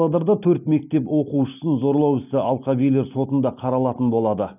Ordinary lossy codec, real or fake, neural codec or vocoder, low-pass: none; real; none; 3.6 kHz